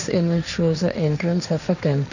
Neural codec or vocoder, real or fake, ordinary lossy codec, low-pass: codec, 16 kHz, 1.1 kbps, Voila-Tokenizer; fake; none; 7.2 kHz